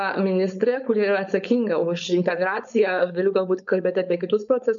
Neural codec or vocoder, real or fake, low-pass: codec, 16 kHz, 4.8 kbps, FACodec; fake; 7.2 kHz